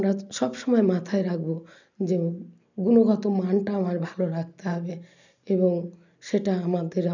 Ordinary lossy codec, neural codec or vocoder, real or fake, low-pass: none; none; real; 7.2 kHz